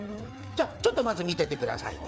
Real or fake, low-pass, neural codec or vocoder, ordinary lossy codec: fake; none; codec, 16 kHz, 4 kbps, FreqCodec, larger model; none